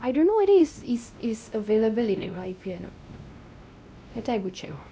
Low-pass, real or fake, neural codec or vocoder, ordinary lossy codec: none; fake; codec, 16 kHz, 1 kbps, X-Codec, WavLM features, trained on Multilingual LibriSpeech; none